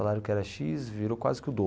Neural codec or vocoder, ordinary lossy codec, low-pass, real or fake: none; none; none; real